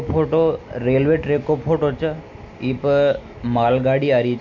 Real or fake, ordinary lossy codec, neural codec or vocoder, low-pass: real; none; none; 7.2 kHz